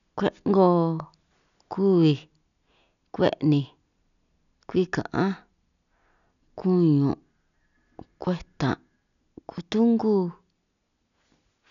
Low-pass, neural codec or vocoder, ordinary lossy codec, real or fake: 7.2 kHz; none; none; real